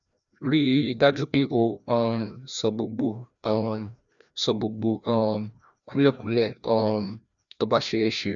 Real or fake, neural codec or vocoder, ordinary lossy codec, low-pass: fake; codec, 16 kHz, 1 kbps, FreqCodec, larger model; none; 7.2 kHz